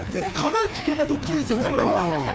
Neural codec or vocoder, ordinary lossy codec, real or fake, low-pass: codec, 16 kHz, 2 kbps, FreqCodec, larger model; none; fake; none